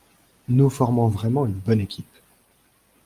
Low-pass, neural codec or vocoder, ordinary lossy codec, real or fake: 14.4 kHz; none; Opus, 32 kbps; real